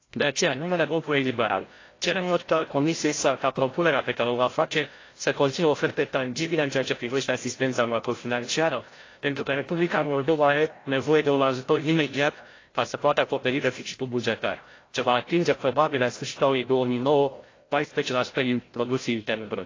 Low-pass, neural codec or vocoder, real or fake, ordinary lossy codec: 7.2 kHz; codec, 16 kHz, 0.5 kbps, FreqCodec, larger model; fake; AAC, 32 kbps